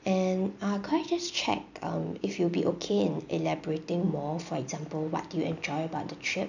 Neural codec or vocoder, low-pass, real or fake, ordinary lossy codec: none; 7.2 kHz; real; none